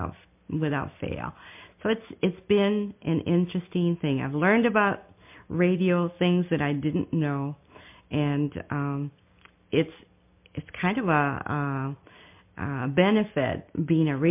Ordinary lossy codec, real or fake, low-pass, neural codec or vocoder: MP3, 32 kbps; real; 3.6 kHz; none